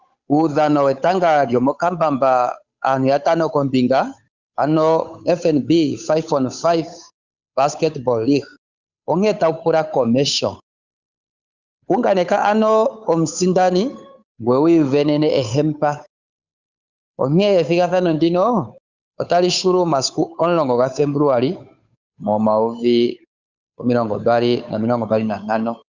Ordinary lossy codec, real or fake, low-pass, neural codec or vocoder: Opus, 64 kbps; fake; 7.2 kHz; codec, 16 kHz, 8 kbps, FunCodec, trained on Chinese and English, 25 frames a second